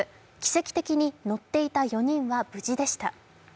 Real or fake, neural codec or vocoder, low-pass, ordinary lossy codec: real; none; none; none